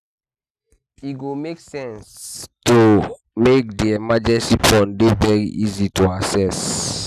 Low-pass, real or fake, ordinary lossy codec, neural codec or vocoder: 14.4 kHz; real; none; none